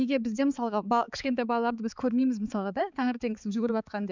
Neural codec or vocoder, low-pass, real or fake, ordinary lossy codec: codec, 16 kHz, 4 kbps, X-Codec, HuBERT features, trained on balanced general audio; 7.2 kHz; fake; none